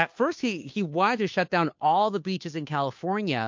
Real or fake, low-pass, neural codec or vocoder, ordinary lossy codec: fake; 7.2 kHz; codec, 16 kHz, 2 kbps, FunCodec, trained on Chinese and English, 25 frames a second; MP3, 48 kbps